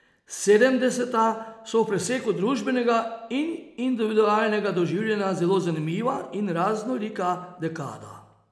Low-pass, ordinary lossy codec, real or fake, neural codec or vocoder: none; none; real; none